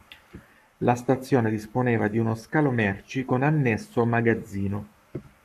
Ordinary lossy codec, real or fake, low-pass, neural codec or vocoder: AAC, 96 kbps; fake; 14.4 kHz; codec, 44.1 kHz, 7.8 kbps, Pupu-Codec